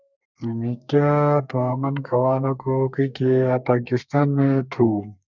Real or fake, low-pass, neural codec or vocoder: fake; 7.2 kHz; codec, 32 kHz, 1.9 kbps, SNAC